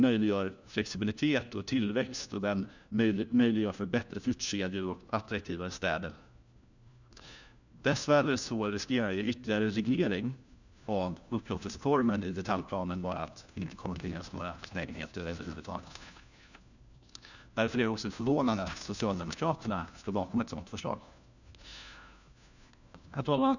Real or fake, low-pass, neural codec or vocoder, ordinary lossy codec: fake; 7.2 kHz; codec, 16 kHz, 1 kbps, FunCodec, trained on LibriTTS, 50 frames a second; none